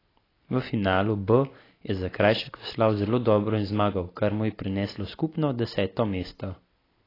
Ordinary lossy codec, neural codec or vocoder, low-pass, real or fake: AAC, 24 kbps; none; 5.4 kHz; real